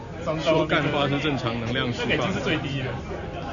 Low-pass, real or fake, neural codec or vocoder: 7.2 kHz; real; none